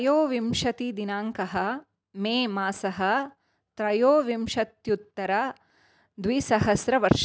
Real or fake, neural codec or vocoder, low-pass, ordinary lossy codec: real; none; none; none